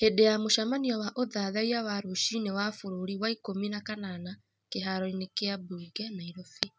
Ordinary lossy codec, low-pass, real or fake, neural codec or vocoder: none; none; real; none